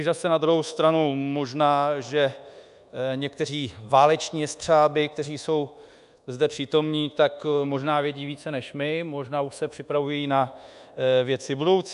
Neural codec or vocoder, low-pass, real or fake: codec, 24 kHz, 1.2 kbps, DualCodec; 10.8 kHz; fake